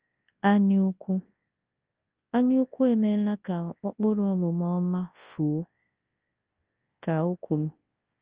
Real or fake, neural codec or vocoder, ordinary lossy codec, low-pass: fake; codec, 24 kHz, 0.9 kbps, WavTokenizer, large speech release; Opus, 24 kbps; 3.6 kHz